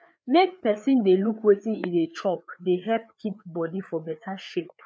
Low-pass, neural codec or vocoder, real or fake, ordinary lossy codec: none; codec, 16 kHz, 4 kbps, FreqCodec, larger model; fake; none